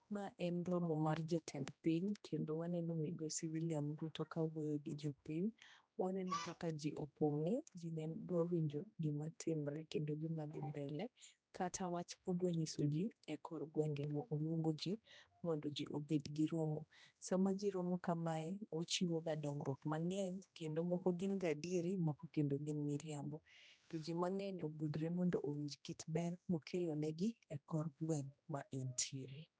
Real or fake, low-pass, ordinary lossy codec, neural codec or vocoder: fake; none; none; codec, 16 kHz, 1 kbps, X-Codec, HuBERT features, trained on general audio